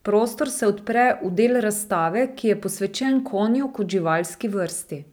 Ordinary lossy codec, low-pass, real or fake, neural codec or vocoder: none; none; real; none